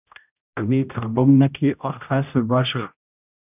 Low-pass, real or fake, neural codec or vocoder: 3.6 kHz; fake; codec, 16 kHz, 0.5 kbps, X-Codec, HuBERT features, trained on general audio